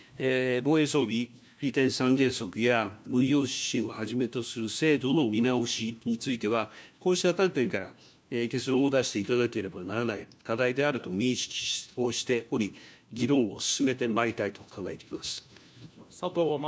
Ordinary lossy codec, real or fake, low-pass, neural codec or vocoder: none; fake; none; codec, 16 kHz, 1 kbps, FunCodec, trained on LibriTTS, 50 frames a second